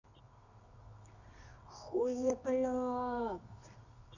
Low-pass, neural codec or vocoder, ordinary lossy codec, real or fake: 7.2 kHz; codec, 24 kHz, 0.9 kbps, WavTokenizer, medium music audio release; none; fake